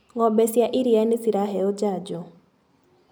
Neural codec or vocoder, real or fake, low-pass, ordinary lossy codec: none; real; none; none